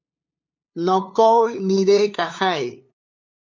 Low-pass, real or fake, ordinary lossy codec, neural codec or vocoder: 7.2 kHz; fake; MP3, 64 kbps; codec, 16 kHz, 2 kbps, FunCodec, trained on LibriTTS, 25 frames a second